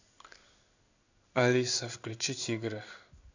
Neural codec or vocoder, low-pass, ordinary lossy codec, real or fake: codec, 16 kHz in and 24 kHz out, 1 kbps, XY-Tokenizer; 7.2 kHz; none; fake